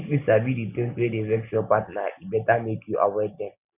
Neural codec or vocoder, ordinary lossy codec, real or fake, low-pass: none; none; real; 3.6 kHz